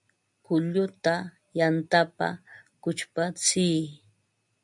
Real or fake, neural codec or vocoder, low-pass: real; none; 10.8 kHz